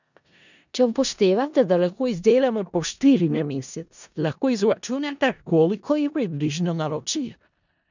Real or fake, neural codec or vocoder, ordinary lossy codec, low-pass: fake; codec, 16 kHz in and 24 kHz out, 0.4 kbps, LongCat-Audio-Codec, four codebook decoder; none; 7.2 kHz